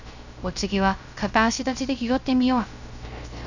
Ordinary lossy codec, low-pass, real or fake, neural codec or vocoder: none; 7.2 kHz; fake; codec, 16 kHz, 0.3 kbps, FocalCodec